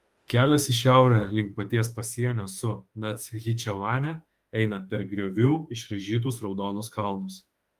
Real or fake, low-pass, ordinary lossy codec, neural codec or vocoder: fake; 14.4 kHz; Opus, 32 kbps; autoencoder, 48 kHz, 32 numbers a frame, DAC-VAE, trained on Japanese speech